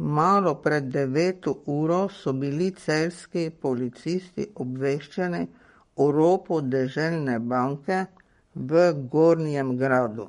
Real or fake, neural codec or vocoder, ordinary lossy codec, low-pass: fake; codec, 44.1 kHz, 7.8 kbps, Pupu-Codec; MP3, 48 kbps; 19.8 kHz